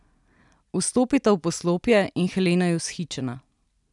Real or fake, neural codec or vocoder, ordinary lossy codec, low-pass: real; none; none; 10.8 kHz